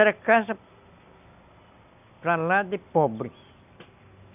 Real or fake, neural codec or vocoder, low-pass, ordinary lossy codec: real; none; 3.6 kHz; none